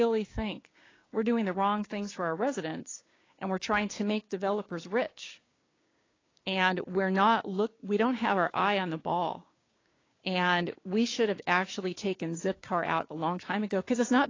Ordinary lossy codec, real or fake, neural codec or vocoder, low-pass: AAC, 32 kbps; fake; codec, 16 kHz, 6 kbps, DAC; 7.2 kHz